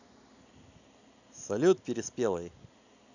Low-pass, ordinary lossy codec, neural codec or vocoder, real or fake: 7.2 kHz; none; none; real